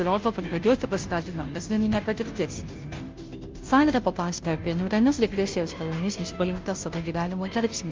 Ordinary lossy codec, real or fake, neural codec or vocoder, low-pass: Opus, 32 kbps; fake; codec, 16 kHz, 0.5 kbps, FunCodec, trained on Chinese and English, 25 frames a second; 7.2 kHz